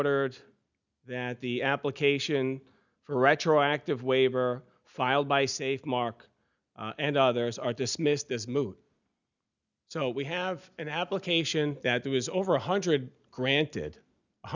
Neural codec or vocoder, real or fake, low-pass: none; real; 7.2 kHz